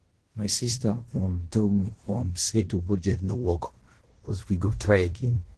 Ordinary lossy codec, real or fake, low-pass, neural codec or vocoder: Opus, 16 kbps; fake; 10.8 kHz; codec, 16 kHz in and 24 kHz out, 0.9 kbps, LongCat-Audio-Codec, fine tuned four codebook decoder